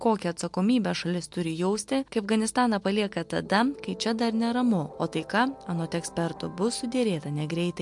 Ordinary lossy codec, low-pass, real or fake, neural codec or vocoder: MP3, 64 kbps; 10.8 kHz; real; none